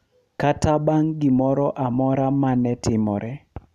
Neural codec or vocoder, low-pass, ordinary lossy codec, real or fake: none; 14.4 kHz; none; real